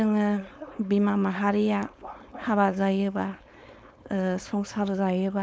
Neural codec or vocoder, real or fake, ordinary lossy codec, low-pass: codec, 16 kHz, 4.8 kbps, FACodec; fake; none; none